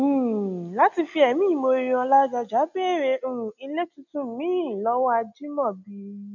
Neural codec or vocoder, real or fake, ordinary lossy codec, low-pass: none; real; none; 7.2 kHz